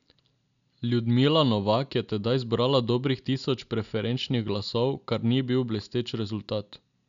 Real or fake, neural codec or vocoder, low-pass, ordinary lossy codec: real; none; 7.2 kHz; none